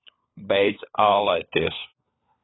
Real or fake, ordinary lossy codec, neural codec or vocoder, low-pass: fake; AAC, 16 kbps; codec, 16 kHz, 4 kbps, FunCodec, trained on LibriTTS, 50 frames a second; 7.2 kHz